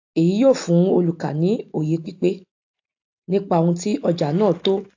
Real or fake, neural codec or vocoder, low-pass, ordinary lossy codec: real; none; 7.2 kHz; AAC, 48 kbps